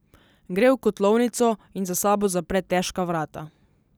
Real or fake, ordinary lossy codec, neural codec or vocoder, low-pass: real; none; none; none